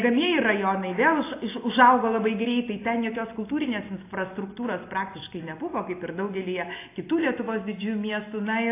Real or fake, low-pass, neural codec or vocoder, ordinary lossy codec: real; 3.6 kHz; none; AAC, 24 kbps